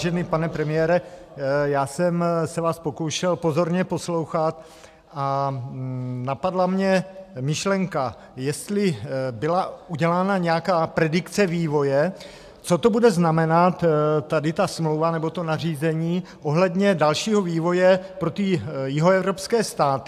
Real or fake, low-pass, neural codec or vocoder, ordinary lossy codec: real; 14.4 kHz; none; AAC, 96 kbps